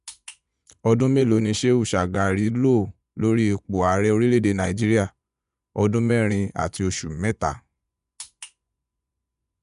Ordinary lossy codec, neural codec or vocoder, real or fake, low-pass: none; vocoder, 24 kHz, 100 mel bands, Vocos; fake; 10.8 kHz